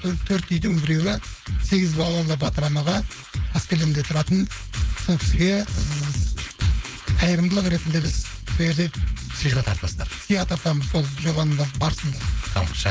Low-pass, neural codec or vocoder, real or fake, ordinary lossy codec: none; codec, 16 kHz, 4.8 kbps, FACodec; fake; none